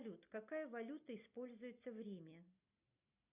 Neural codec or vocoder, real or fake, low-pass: none; real; 3.6 kHz